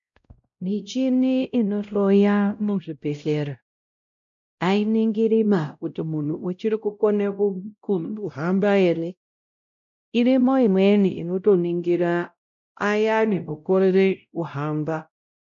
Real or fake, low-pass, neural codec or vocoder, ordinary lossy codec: fake; 7.2 kHz; codec, 16 kHz, 0.5 kbps, X-Codec, WavLM features, trained on Multilingual LibriSpeech; MP3, 64 kbps